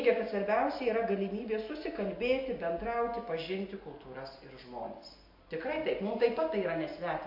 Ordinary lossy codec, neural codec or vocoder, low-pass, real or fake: MP3, 32 kbps; none; 5.4 kHz; real